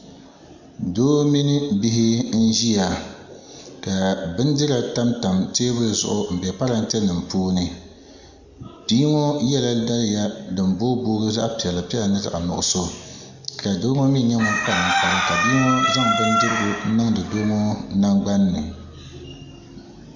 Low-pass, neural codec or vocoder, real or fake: 7.2 kHz; none; real